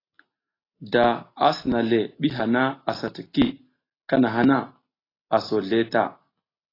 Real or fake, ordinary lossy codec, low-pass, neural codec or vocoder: real; AAC, 24 kbps; 5.4 kHz; none